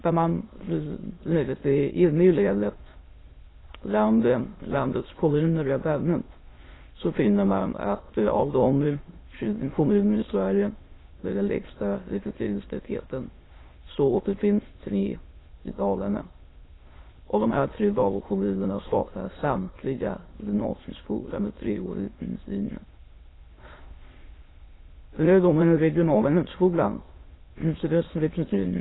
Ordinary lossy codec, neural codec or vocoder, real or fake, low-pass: AAC, 16 kbps; autoencoder, 22.05 kHz, a latent of 192 numbers a frame, VITS, trained on many speakers; fake; 7.2 kHz